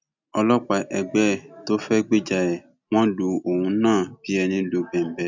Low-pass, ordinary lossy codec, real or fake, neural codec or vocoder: 7.2 kHz; none; real; none